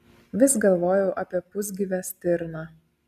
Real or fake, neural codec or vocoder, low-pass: real; none; 14.4 kHz